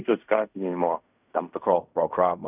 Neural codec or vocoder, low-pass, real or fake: codec, 16 kHz in and 24 kHz out, 0.4 kbps, LongCat-Audio-Codec, fine tuned four codebook decoder; 3.6 kHz; fake